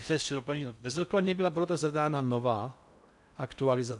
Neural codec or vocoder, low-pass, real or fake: codec, 16 kHz in and 24 kHz out, 0.6 kbps, FocalCodec, streaming, 4096 codes; 10.8 kHz; fake